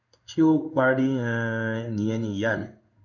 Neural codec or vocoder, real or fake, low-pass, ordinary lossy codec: codec, 16 kHz in and 24 kHz out, 1 kbps, XY-Tokenizer; fake; 7.2 kHz; none